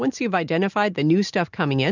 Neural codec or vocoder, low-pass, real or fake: none; 7.2 kHz; real